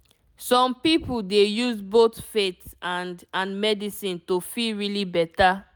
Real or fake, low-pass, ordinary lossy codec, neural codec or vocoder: real; none; none; none